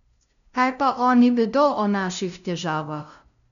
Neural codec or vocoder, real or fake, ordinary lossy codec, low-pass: codec, 16 kHz, 0.5 kbps, FunCodec, trained on LibriTTS, 25 frames a second; fake; none; 7.2 kHz